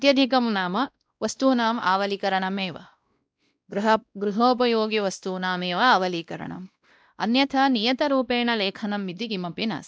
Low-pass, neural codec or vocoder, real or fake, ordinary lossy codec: none; codec, 16 kHz, 1 kbps, X-Codec, WavLM features, trained on Multilingual LibriSpeech; fake; none